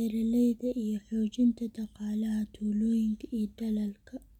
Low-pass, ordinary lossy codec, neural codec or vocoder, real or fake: 19.8 kHz; none; none; real